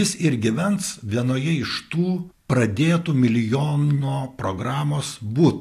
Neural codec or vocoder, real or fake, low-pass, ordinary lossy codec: none; real; 14.4 kHz; AAC, 64 kbps